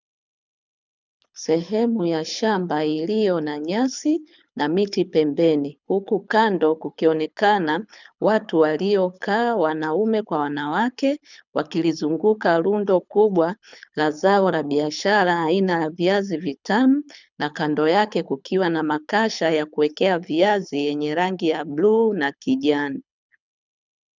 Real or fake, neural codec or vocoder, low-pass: fake; codec, 24 kHz, 6 kbps, HILCodec; 7.2 kHz